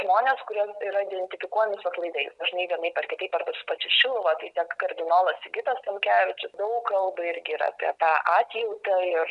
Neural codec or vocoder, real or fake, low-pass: none; real; 10.8 kHz